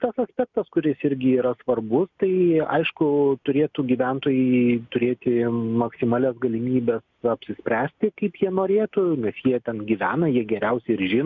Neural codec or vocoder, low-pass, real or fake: none; 7.2 kHz; real